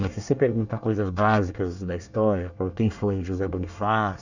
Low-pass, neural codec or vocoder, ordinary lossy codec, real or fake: 7.2 kHz; codec, 24 kHz, 1 kbps, SNAC; none; fake